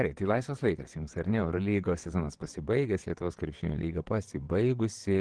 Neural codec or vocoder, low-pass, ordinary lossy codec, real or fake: vocoder, 22.05 kHz, 80 mel bands, WaveNeXt; 9.9 kHz; Opus, 16 kbps; fake